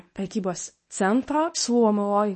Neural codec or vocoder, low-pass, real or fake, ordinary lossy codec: codec, 24 kHz, 0.9 kbps, WavTokenizer, medium speech release version 1; 10.8 kHz; fake; MP3, 32 kbps